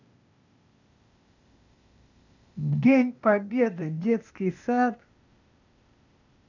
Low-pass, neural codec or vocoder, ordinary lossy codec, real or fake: 7.2 kHz; codec, 16 kHz, 0.8 kbps, ZipCodec; none; fake